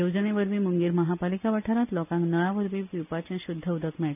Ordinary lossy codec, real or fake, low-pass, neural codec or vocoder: none; real; 3.6 kHz; none